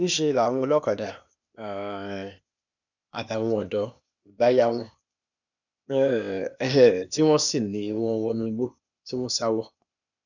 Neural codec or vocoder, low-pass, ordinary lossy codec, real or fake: codec, 16 kHz, 0.8 kbps, ZipCodec; 7.2 kHz; none; fake